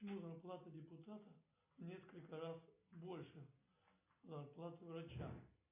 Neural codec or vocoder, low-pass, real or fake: none; 3.6 kHz; real